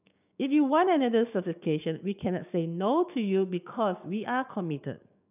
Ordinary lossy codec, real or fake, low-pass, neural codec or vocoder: none; fake; 3.6 kHz; codec, 16 kHz, 6 kbps, DAC